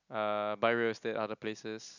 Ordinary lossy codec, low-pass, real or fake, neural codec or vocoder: none; 7.2 kHz; real; none